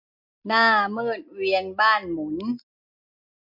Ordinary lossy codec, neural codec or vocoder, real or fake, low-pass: MP3, 32 kbps; none; real; 5.4 kHz